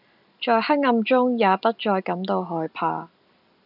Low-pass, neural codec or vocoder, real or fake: 5.4 kHz; none; real